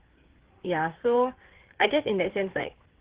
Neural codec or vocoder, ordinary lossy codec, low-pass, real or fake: codec, 16 kHz, 4 kbps, FreqCodec, larger model; Opus, 16 kbps; 3.6 kHz; fake